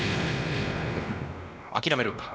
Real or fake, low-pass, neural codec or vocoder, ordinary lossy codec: fake; none; codec, 16 kHz, 1 kbps, X-Codec, WavLM features, trained on Multilingual LibriSpeech; none